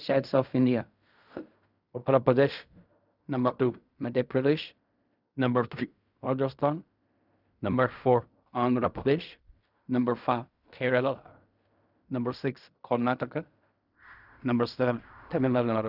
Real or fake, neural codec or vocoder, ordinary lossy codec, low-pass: fake; codec, 16 kHz in and 24 kHz out, 0.4 kbps, LongCat-Audio-Codec, fine tuned four codebook decoder; none; 5.4 kHz